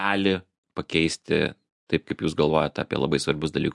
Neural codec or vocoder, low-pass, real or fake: none; 10.8 kHz; real